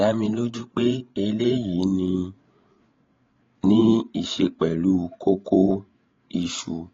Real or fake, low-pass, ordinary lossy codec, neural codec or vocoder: fake; 7.2 kHz; AAC, 32 kbps; codec, 16 kHz, 8 kbps, FreqCodec, larger model